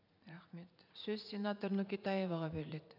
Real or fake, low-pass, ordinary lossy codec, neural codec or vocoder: fake; 5.4 kHz; none; vocoder, 44.1 kHz, 80 mel bands, Vocos